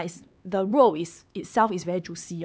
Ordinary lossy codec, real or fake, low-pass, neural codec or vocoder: none; fake; none; codec, 16 kHz, 4 kbps, X-Codec, HuBERT features, trained on LibriSpeech